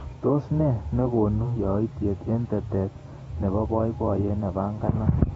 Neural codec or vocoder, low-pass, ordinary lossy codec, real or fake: vocoder, 48 kHz, 128 mel bands, Vocos; 19.8 kHz; AAC, 24 kbps; fake